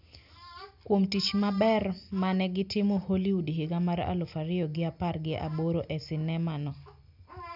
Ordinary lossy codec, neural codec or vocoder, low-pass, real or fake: none; none; 5.4 kHz; real